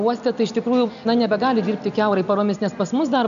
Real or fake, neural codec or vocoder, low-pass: real; none; 7.2 kHz